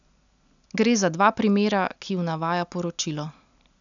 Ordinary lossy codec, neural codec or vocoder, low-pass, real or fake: none; none; 7.2 kHz; real